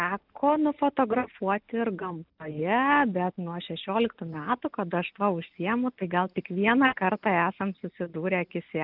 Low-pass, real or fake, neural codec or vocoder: 5.4 kHz; real; none